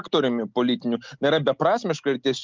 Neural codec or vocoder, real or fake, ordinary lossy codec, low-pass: none; real; Opus, 24 kbps; 7.2 kHz